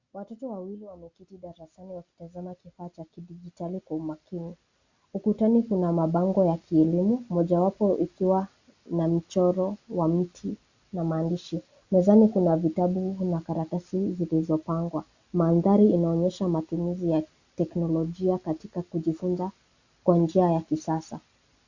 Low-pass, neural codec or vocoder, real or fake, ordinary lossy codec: 7.2 kHz; none; real; Opus, 64 kbps